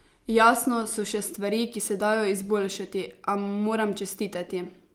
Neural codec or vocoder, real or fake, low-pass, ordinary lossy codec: none; real; 19.8 kHz; Opus, 24 kbps